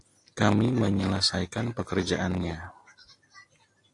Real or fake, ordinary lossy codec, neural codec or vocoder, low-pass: real; AAC, 48 kbps; none; 10.8 kHz